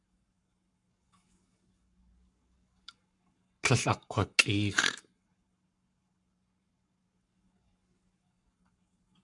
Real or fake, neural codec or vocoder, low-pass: fake; codec, 44.1 kHz, 7.8 kbps, Pupu-Codec; 10.8 kHz